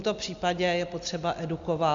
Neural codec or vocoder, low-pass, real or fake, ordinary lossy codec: none; 7.2 kHz; real; Opus, 64 kbps